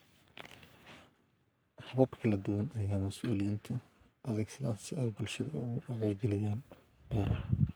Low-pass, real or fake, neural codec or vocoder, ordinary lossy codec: none; fake; codec, 44.1 kHz, 3.4 kbps, Pupu-Codec; none